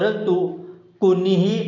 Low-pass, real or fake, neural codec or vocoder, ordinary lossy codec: 7.2 kHz; real; none; none